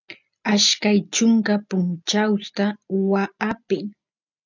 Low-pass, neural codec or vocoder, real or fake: 7.2 kHz; none; real